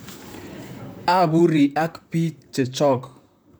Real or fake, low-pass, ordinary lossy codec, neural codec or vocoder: fake; none; none; vocoder, 44.1 kHz, 128 mel bands, Pupu-Vocoder